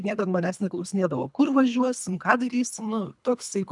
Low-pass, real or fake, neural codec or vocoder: 10.8 kHz; fake; codec, 24 kHz, 3 kbps, HILCodec